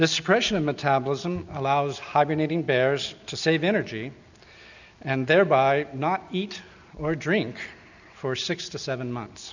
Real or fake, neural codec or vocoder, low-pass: real; none; 7.2 kHz